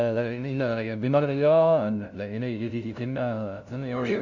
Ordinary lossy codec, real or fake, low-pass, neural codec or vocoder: none; fake; 7.2 kHz; codec, 16 kHz, 0.5 kbps, FunCodec, trained on LibriTTS, 25 frames a second